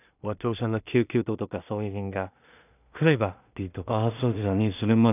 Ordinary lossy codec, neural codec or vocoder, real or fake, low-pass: none; codec, 16 kHz in and 24 kHz out, 0.4 kbps, LongCat-Audio-Codec, two codebook decoder; fake; 3.6 kHz